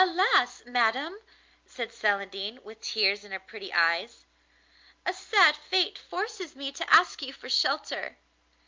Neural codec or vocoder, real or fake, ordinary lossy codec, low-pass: none; real; Opus, 24 kbps; 7.2 kHz